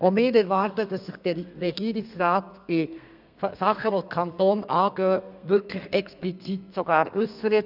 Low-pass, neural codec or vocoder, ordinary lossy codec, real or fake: 5.4 kHz; codec, 32 kHz, 1.9 kbps, SNAC; none; fake